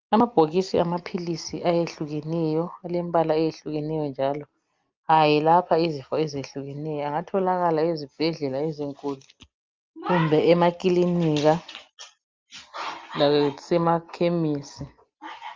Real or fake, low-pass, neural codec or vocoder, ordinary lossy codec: real; 7.2 kHz; none; Opus, 32 kbps